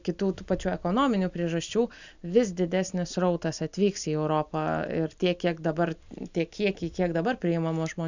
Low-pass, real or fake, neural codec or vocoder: 7.2 kHz; real; none